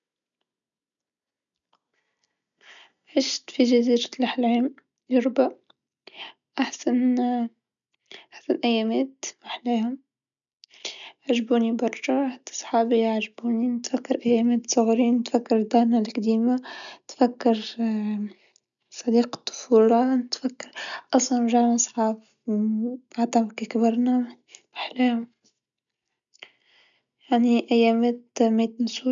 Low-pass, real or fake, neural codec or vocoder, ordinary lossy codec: 7.2 kHz; real; none; none